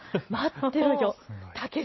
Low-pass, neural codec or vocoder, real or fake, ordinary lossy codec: 7.2 kHz; none; real; MP3, 24 kbps